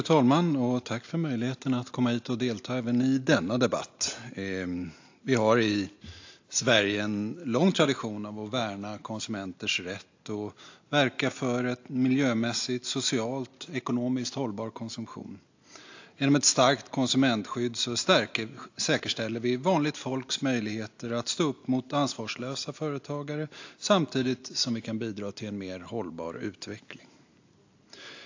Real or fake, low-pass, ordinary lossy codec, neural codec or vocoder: real; 7.2 kHz; AAC, 48 kbps; none